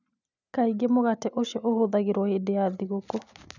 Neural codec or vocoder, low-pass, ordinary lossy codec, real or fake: none; 7.2 kHz; none; real